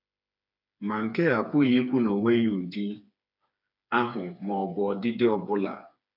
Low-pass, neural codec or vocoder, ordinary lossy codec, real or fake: 5.4 kHz; codec, 16 kHz, 4 kbps, FreqCodec, smaller model; none; fake